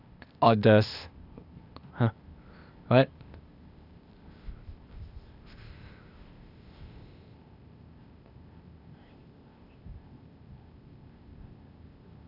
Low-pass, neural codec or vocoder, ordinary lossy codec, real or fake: 5.4 kHz; codec, 16 kHz, 0.8 kbps, ZipCodec; none; fake